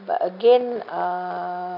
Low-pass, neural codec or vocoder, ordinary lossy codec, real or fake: 5.4 kHz; none; AAC, 48 kbps; real